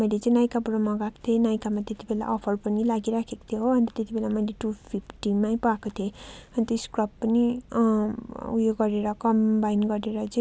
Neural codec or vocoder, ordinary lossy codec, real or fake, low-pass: none; none; real; none